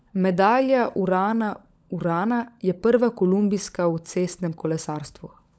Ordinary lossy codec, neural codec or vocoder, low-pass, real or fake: none; codec, 16 kHz, 16 kbps, FunCodec, trained on LibriTTS, 50 frames a second; none; fake